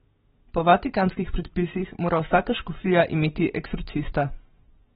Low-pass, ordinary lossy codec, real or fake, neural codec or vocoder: 9.9 kHz; AAC, 16 kbps; real; none